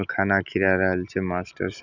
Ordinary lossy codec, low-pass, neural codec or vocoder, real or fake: none; 7.2 kHz; none; real